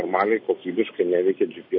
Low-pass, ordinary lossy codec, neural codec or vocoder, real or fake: 5.4 kHz; MP3, 32 kbps; none; real